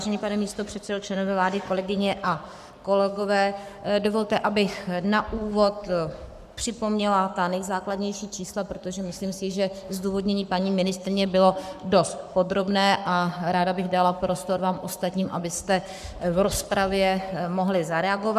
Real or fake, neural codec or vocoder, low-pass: fake; codec, 44.1 kHz, 7.8 kbps, Pupu-Codec; 14.4 kHz